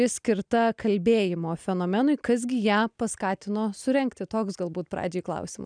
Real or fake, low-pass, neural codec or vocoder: real; 9.9 kHz; none